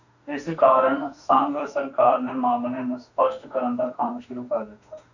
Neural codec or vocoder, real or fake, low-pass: autoencoder, 48 kHz, 32 numbers a frame, DAC-VAE, trained on Japanese speech; fake; 7.2 kHz